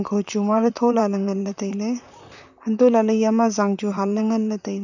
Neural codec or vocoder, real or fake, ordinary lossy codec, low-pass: vocoder, 44.1 kHz, 128 mel bands, Pupu-Vocoder; fake; none; 7.2 kHz